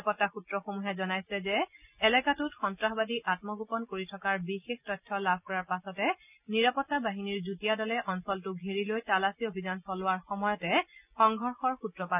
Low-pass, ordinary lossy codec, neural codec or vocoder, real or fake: 3.6 kHz; none; none; real